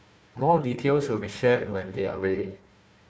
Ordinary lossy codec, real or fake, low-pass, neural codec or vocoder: none; fake; none; codec, 16 kHz, 1 kbps, FunCodec, trained on Chinese and English, 50 frames a second